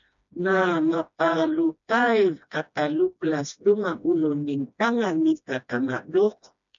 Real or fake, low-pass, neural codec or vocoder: fake; 7.2 kHz; codec, 16 kHz, 1 kbps, FreqCodec, smaller model